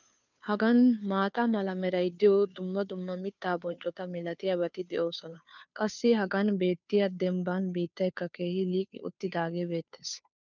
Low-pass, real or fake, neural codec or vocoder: 7.2 kHz; fake; codec, 16 kHz, 2 kbps, FunCodec, trained on Chinese and English, 25 frames a second